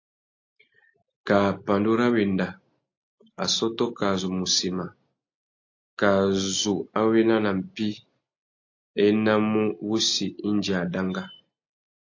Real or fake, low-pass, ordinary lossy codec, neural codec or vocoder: real; 7.2 kHz; AAC, 48 kbps; none